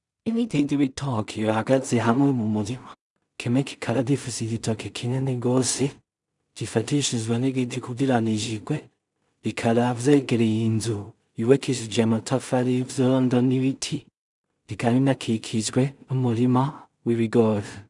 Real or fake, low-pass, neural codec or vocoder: fake; 10.8 kHz; codec, 16 kHz in and 24 kHz out, 0.4 kbps, LongCat-Audio-Codec, two codebook decoder